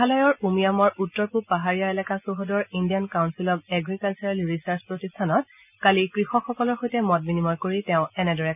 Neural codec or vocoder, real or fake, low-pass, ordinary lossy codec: none; real; 3.6 kHz; none